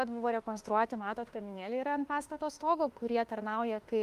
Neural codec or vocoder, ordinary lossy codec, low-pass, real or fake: autoencoder, 48 kHz, 32 numbers a frame, DAC-VAE, trained on Japanese speech; Opus, 32 kbps; 14.4 kHz; fake